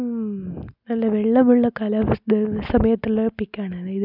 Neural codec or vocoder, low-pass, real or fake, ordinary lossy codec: none; 5.4 kHz; real; none